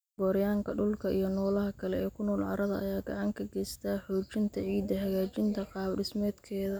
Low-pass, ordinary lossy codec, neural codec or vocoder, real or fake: none; none; none; real